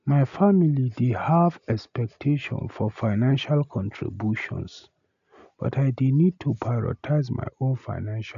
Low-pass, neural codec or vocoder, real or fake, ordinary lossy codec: 7.2 kHz; none; real; none